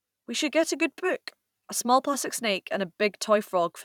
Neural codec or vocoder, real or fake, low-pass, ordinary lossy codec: vocoder, 44.1 kHz, 128 mel bands every 512 samples, BigVGAN v2; fake; 19.8 kHz; none